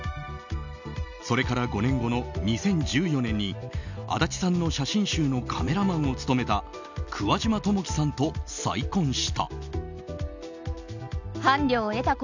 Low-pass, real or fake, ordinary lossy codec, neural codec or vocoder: 7.2 kHz; real; none; none